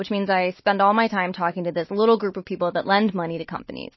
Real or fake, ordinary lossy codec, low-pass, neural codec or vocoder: real; MP3, 24 kbps; 7.2 kHz; none